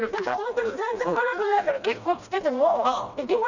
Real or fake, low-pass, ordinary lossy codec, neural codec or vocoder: fake; 7.2 kHz; none; codec, 16 kHz, 1 kbps, FreqCodec, smaller model